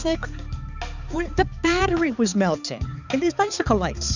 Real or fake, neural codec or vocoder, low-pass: fake; codec, 16 kHz, 4 kbps, X-Codec, HuBERT features, trained on balanced general audio; 7.2 kHz